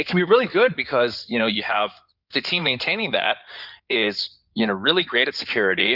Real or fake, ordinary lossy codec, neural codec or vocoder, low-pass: fake; Opus, 64 kbps; codec, 16 kHz in and 24 kHz out, 2.2 kbps, FireRedTTS-2 codec; 5.4 kHz